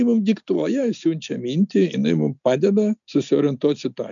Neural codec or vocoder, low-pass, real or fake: none; 7.2 kHz; real